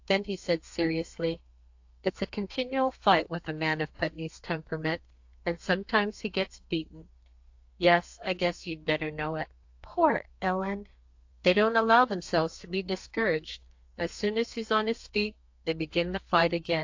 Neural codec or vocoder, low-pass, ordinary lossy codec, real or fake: codec, 32 kHz, 1.9 kbps, SNAC; 7.2 kHz; AAC, 48 kbps; fake